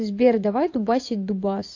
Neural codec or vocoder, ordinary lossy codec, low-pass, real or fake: vocoder, 24 kHz, 100 mel bands, Vocos; AAC, 48 kbps; 7.2 kHz; fake